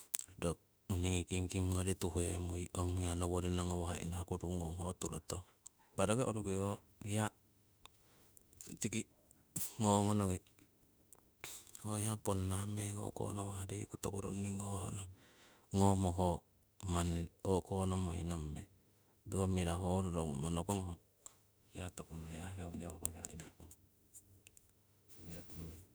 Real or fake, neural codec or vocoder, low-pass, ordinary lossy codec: fake; autoencoder, 48 kHz, 32 numbers a frame, DAC-VAE, trained on Japanese speech; none; none